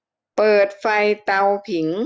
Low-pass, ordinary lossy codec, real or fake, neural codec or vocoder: none; none; real; none